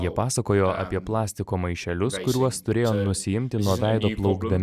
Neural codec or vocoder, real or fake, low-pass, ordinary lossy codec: none; real; 14.4 kHz; Opus, 64 kbps